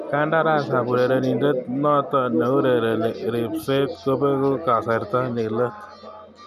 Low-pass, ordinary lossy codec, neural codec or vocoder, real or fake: 14.4 kHz; none; none; real